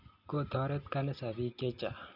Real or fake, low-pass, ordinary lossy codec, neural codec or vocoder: real; 5.4 kHz; none; none